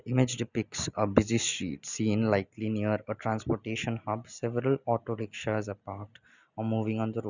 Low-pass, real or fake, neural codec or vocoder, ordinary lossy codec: 7.2 kHz; real; none; none